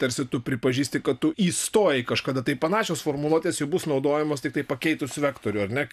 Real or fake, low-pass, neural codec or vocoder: real; 14.4 kHz; none